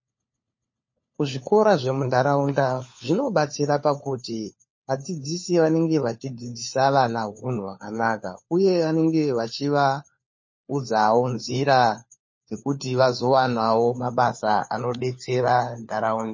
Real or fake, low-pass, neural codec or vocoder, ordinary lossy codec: fake; 7.2 kHz; codec, 16 kHz, 4 kbps, FunCodec, trained on LibriTTS, 50 frames a second; MP3, 32 kbps